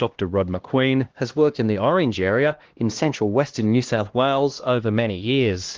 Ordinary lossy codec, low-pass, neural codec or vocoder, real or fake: Opus, 16 kbps; 7.2 kHz; codec, 16 kHz, 1 kbps, X-Codec, HuBERT features, trained on LibriSpeech; fake